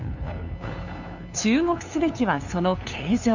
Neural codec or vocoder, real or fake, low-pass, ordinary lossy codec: codec, 16 kHz, 2 kbps, FunCodec, trained on LibriTTS, 25 frames a second; fake; 7.2 kHz; none